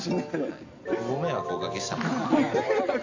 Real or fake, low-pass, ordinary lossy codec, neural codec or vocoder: real; 7.2 kHz; AAC, 48 kbps; none